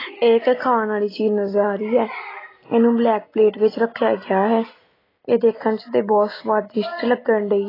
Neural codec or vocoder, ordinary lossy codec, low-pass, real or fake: none; AAC, 24 kbps; 5.4 kHz; real